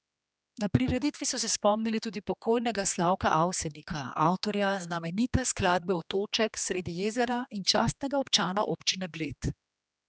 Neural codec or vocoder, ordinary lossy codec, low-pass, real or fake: codec, 16 kHz, 2 kbps, X-Codec, HuBERT features, trained on general audio; none; none; fake